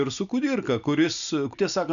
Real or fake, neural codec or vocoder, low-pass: real; none; 7.2 kHz